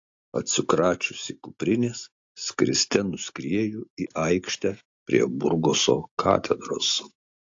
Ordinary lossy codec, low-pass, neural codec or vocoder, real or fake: AAC, 48 kbps; 7.2 kHz; none; real